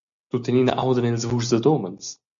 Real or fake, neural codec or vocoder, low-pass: real; none; 7.2 kHz